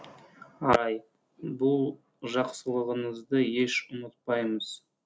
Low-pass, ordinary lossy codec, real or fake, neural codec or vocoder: none; none; real; none